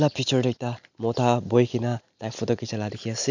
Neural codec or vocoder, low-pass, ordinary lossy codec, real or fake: none; 7.2 kHz; none; real